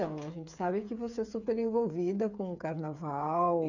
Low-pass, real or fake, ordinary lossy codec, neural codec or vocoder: 7.2 kHz; fake; none; codec, 16 kHz, 8 kbps, FreqCodec, smaller model